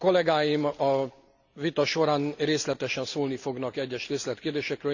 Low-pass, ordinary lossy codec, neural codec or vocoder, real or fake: 7.2 kHz; none; none; real